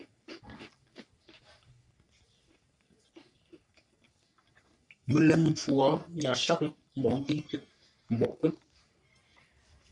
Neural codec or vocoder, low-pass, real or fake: codec, 44.1 kHz, 3.4 kbps, Pupu-Codec; 10.8 kHz; fake